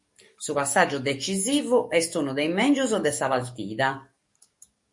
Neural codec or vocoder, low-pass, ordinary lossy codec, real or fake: codec, 44.1 kHz, 7.8 kbps, DAC; 10.8 kHz; MP3, 48 kbps; fake